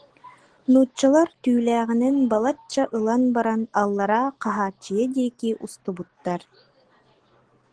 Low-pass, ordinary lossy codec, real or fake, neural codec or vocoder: 9.9 kHz; Opus, 16 kbps; real; none